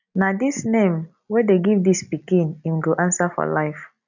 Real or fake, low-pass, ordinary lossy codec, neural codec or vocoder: real; 7.2 kHz; none; none